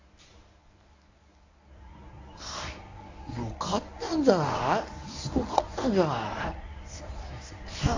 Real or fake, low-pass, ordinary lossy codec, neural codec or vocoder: fake; 7.2 kHz; AAC, 48 kbps; codec, 24 kHz, 0.9 kbps, WavTokenizer, medium speech release version 1